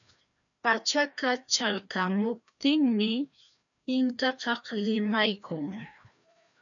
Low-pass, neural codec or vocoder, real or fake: 7.2 kHz; codec, 16 kHz, 1 kbps, FreqCodec, larger model; fake